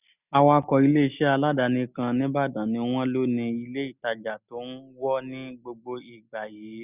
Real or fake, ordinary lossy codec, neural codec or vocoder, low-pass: real; none; none; 3.6 kHz